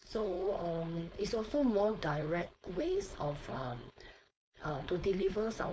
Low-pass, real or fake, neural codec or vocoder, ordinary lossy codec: none; fake; codec, 16 kHz, 4.8 kbps, FACodec; none